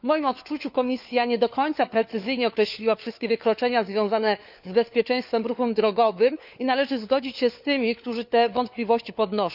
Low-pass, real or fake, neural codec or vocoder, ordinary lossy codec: 5.4 kHz; fake; codec, 16 kHz, 4 kbps, FunCodec, trained on LibriTTS, 50 frames a second; none